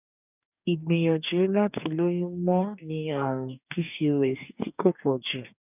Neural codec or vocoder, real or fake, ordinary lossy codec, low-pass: codec, 44.1 kHz, 2.6 kbps, DAC; fake; none; 3.6 kHz